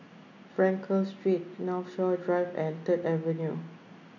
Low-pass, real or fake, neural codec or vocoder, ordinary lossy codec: 7.2 kHz; real; none; none